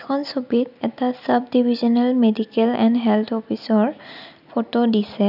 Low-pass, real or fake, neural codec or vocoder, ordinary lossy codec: 5.4 kHz; real; none; none